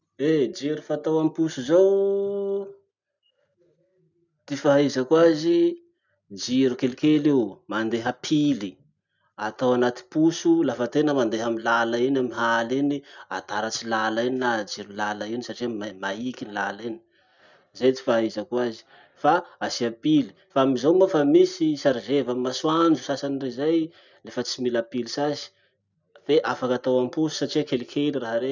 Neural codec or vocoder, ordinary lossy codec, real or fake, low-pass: none; none; real; 7.2 kHz